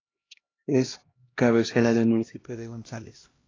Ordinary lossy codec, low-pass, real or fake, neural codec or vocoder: AAC, 32 kbps; 7.2 kHz; fake; codec, 16 kHz, 1 kbps, X-Codec, HuBERT features, trained on LibriSpeech